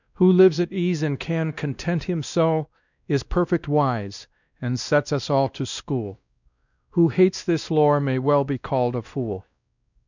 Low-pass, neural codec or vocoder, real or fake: 7.2 kHz; codec, 16 kHz, 1 kbps, X-Codec, WavLM features, trained on Multilingual LibriSpeech; fake